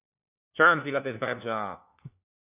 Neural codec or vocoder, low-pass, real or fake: codec, 16 kHz, 1 kbps, FunCodec, trained on LibriTTS, 50 frames a second; 3.6 kHz; fake